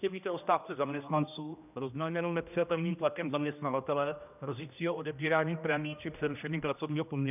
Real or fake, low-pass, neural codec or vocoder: fake; 3.6 kHz; codec, 16 kHz, 1 kbps, X-Codec, HuBERT features, trained on general audio